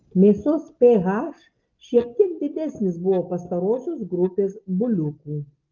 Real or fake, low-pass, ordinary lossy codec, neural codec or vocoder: real; 7.2 kHz; Opus, 32 kbps; none